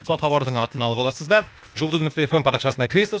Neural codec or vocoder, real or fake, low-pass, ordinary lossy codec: codec, 16 kHz, 0.8 kbps, ZipCodec; fake; none; none